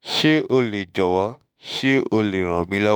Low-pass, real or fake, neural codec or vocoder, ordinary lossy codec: none; fake; autoencoder, 48 kHz, 32 numbers a frame, DAC-VAE, trained on Japanese speech; none